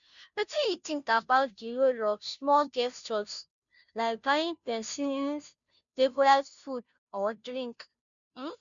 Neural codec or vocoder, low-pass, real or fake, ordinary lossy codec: codec, 16 kHz, 0.5 kbps, FunCodec, trained on Chinese and English, 25 frames a second; 7.2 kHz; fake; none